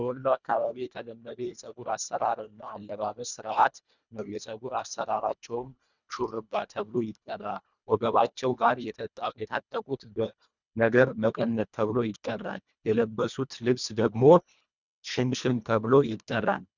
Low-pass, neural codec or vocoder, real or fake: 7.2 kHz; codec, 24 kHz, 1.5 kbps, HILCodec; fake